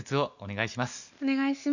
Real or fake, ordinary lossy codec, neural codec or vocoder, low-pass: real; none; none; 7.2 kHz